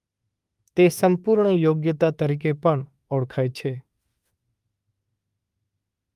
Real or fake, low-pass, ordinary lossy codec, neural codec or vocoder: fake; 14.4 kHz; Opus, 32 kbps; autoencoder, 48 kHz, 32 numbers a frame, DAC-VAE, trained on Japanese speech